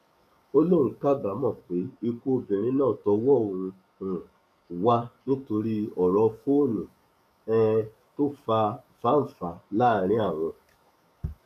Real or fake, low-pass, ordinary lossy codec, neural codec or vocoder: fake; 14.4 kHz; none; codec, 44.1 kHz, 7.8 kbps, DAC